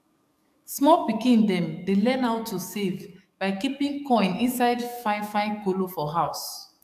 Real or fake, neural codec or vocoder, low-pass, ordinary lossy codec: fake; codec, 44.1 kHz, 7.8 kbps, DAC; 14.4 kHz; AAC, 96 kbps